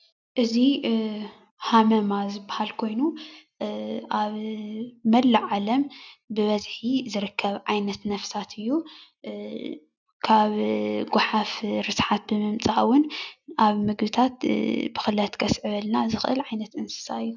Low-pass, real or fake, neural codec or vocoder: 7.2 kHz; real; none